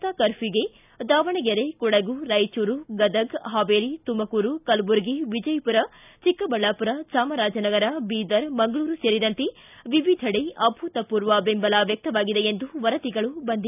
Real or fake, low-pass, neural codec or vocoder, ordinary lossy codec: real; 3.6 kHz; none; none